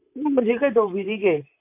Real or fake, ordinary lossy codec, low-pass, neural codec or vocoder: fake; MP3, 32 kbps; 3.6 kHz; vocoder, 44.1 kHz, 128 mel bands, Pupu-Vocoder